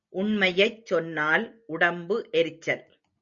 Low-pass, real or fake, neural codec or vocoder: 7.2 kHz; real; none